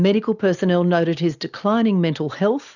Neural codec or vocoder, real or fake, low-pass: none; real; 7.2 kHz